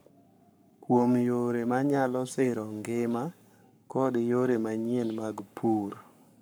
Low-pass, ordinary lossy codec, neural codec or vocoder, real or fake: none; none; codec, 44.1 kHz, 7.8 kbps, Pupu-Codec; fake